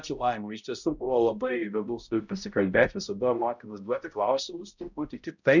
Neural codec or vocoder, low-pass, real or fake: codec, 16 kHz, 0.5 kbps, X-Codec, HuBERT features, trained on balanced general audio; 7.2 kHz; fake